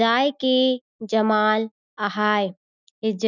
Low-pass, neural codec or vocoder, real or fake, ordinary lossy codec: 7.2 kHz; none; real; none